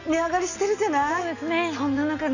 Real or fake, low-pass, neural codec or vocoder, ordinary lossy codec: real; 7.2 kHz; none; none